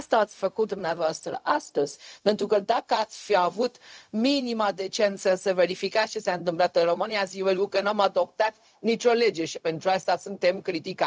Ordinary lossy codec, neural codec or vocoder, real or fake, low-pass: none; codec, 16 kHz, 0.4 kbps, LongCat-Audio-Codec; fake; none